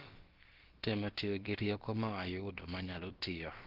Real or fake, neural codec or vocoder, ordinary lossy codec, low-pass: fake; codec, 16 kHz, about 1 kbps, DyCAST, with the encoder's durations; Opus, 16 kbps; 5.4 kHz